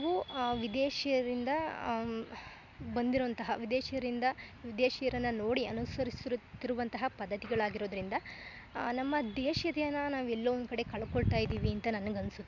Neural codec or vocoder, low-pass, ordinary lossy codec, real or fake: none; 7.2 kHz; MP3, 64 kbps; real